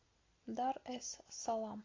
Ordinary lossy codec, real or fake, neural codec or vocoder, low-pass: AAC, 32 kbps; real; none; 7.2 kHz